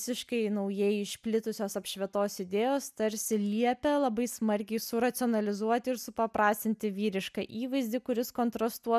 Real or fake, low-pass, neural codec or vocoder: real; 14.4 kHz; none